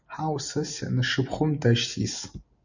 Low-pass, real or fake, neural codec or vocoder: 7.2 kHz; real; none